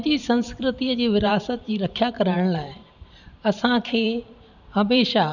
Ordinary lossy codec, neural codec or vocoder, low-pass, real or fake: none; vocoder, 44.1 kHz, 128 mel bands every 512 samples, BigVGAN v2; 7.2 kHz; fake